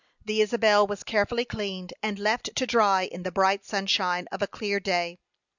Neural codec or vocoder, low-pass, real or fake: none; 7.2 kHz; real